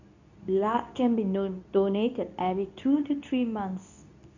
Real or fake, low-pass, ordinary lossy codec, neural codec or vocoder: fake; 7.2 kHz; none; codec, 24 kHz, 0.9 kbps, WavTokenizer, medium speech release version 2